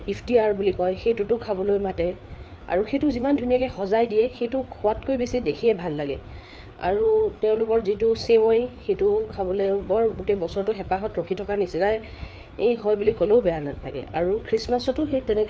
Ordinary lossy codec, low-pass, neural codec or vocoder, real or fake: none; none; codec, 16 kHz, 4 kbps, FreqCodec, larger model; fake